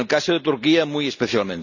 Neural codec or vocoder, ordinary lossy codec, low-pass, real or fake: none; none; 7.2 kHz; real